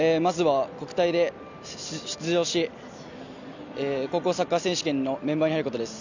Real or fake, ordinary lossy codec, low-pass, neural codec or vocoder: real; none; 7.2 kHz; none